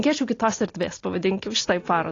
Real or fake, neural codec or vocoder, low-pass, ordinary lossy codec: real; none; 7.2 kHz; AAC, 32 kbps